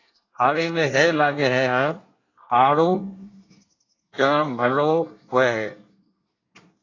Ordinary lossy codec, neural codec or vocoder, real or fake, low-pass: AAC, 32 kbps; codec, 24 kHz, 1 kbps, SNAC; fake; 7.2 kHz